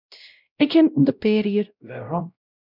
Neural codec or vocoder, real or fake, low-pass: codec, 16 kHz, 0.5 kbps, X-Codec, WavLM features, trained on Multilingual LibriSpeech; fake; 5.4 kHz